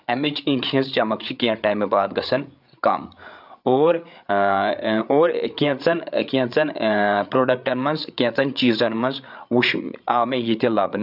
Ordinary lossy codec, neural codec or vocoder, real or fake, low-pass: none; codec, 16 kHz, 8 kbps, FreqCodec, larger model; fake; 5.4 kHz